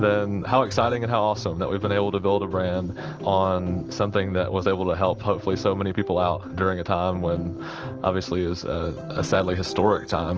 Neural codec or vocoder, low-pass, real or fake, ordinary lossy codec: none; 7.2 kHz; real; Opus, 16 kbps